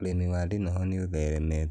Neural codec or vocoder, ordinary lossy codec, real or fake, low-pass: none; none; real; none